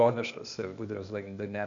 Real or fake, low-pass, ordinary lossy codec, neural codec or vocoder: fake; 7.2 kHz; MP3, 64 kbps; codec, 16 kHz, 0.8 kbps, ZipCodec